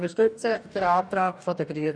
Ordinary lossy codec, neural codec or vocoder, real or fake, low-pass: none; codec, 44.1 kHz, 2.6 kbps, DAC; fake; 9.9 kHz